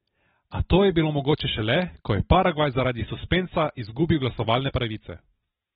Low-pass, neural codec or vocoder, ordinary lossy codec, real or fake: 19.8 kHz; none; AAC, 16 kbps; real